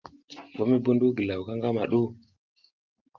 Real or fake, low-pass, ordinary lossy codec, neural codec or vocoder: real; 7.2 kHz; Opus, 24 kbps; none